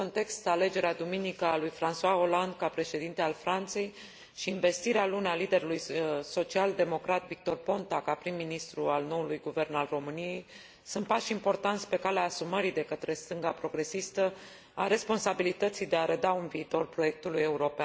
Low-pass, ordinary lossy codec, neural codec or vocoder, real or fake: none; none; none; real